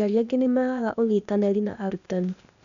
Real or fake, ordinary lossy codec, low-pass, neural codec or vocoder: fake; none; 7.2 kHz; codec, 16 kHz, 0.8 kbps, ZipCodec